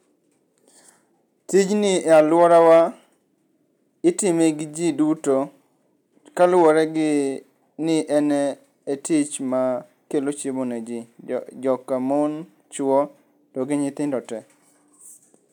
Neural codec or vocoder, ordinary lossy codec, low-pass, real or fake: none; none; 19.8 kHz; real